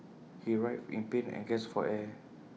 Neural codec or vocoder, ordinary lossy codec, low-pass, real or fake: none; none; none; real